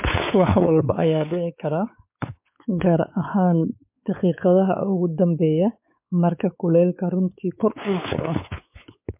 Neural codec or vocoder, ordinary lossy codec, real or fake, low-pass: codec, 16 kHz, 4 kbps, X-Codec, WavLM features, trained on Multilingual LibriSpeech; MP3, 32 kbps; fake; 3.6 kHz